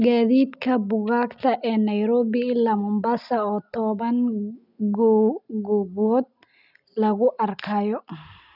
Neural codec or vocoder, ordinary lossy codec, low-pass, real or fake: none; none; 5.4 kHz; real